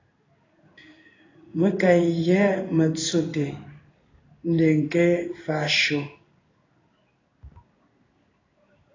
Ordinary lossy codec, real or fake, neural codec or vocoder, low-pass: AAC, 32 kbps; fake; codec, 16 kHz in and 24 kHz out, 1 kbps, XY-Tokenizer; 7.2 kHz